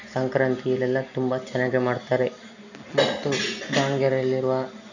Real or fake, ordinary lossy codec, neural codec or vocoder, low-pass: real; none; none; 7.2 kHz